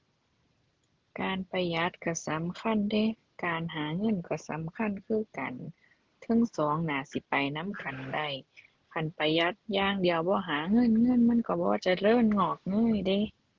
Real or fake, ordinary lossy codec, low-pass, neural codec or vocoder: real; Opus, 16 kbps; 7.2 kHz; none